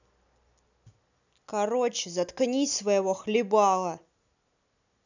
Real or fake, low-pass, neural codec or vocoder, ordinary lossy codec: real; 7.2 kHz; none; none